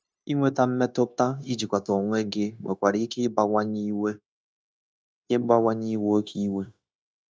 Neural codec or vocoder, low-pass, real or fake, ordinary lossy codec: codec, 16 kHz, 0.9 kbps, LongCat-Audio-Codec; none; fake; none